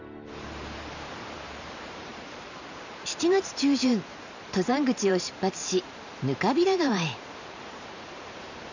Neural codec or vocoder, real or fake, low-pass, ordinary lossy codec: vocoder, 44.1 kHz, 128 mel bands every 512 samples, BigVGAN v2; fake; 7.2 kHz; none